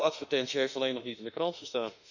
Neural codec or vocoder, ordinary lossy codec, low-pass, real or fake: autoencoder, 48 kHz, 32 numbers a frame, DAC-VAE, trained on Japanese speech; none; 7.2 kHz; fake